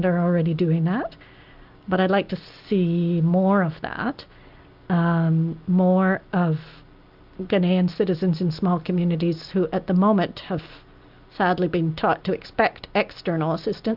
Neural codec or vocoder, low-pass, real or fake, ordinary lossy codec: none; 5.4 kHz; real; Opus, 32 kbps